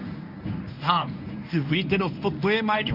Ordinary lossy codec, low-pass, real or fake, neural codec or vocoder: none; 5.4 kHz; fake; codec, 24 kHz, 0.9 kbps, WavTokenizer, medium speech release version 1